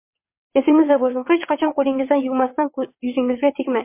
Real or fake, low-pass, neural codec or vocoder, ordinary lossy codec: fake; 3.6 kHz; vocoder, 22.05 kHz, 80 mel bands, WaveNeXt; MP3, 24 kbps